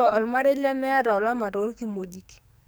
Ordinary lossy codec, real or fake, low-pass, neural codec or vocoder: none; fake; none; codec, 44.1 kHz, 2.6 kbps, SNAC